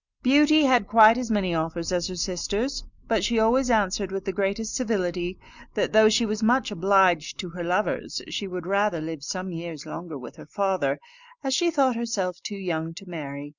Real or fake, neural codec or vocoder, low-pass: real; none; 7.2 kHz